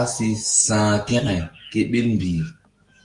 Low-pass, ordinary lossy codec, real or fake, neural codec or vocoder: 10.8 kHz; Opus, 24 kbps; fake; autoencoder, 48 kHz, 128 numbers a frame, DAC-VAE, trained on Japanese speech